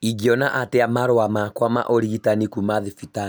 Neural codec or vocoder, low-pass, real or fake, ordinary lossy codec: none; none; real; none